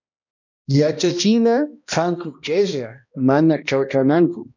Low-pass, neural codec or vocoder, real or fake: 7.2 kHz; codec, 16 kHz, 1 kbps, X-Codec, HuBERT features, trained on balanced general audio; fake